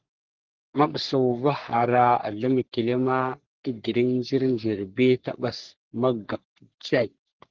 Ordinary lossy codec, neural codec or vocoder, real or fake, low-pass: Opus, 16 kbps; codec, 44.1 kHz, 3.4 kbps, Pupu-Codec; fake; 7.2 kHz